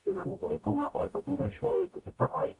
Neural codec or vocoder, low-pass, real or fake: codec, 44.1 kHz, 0.9 kbps, DAC; 10.8 kHz; fake